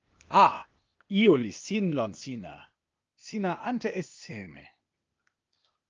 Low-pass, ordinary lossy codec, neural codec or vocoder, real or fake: 7.2 kHz; Opus, 24 kbps; codec, 16 kHz, 0.8 kbps, ZipCodec; fake